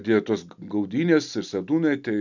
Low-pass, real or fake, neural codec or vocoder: 7.2 kHz; real; none